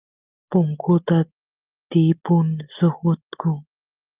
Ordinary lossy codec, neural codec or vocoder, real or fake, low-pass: Opus, 32 kbps; none; real; 3.6 kHz